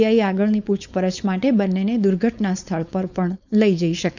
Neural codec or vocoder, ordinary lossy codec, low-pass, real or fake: codec, 16 kHz, 4.8 kbps, FACodec; none; 7.2 kHz; fake